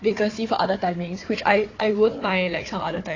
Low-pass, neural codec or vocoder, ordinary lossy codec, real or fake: 7.2 kHz; codec, 16 kHz, 4 kbps, FunCodec, trained on Chinese and English, 50 frames a second; AAC, 32 kbps; fake